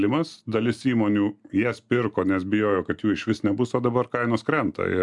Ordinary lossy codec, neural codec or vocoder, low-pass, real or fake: MP3, 96 kbps; none; 10.8 kHz; real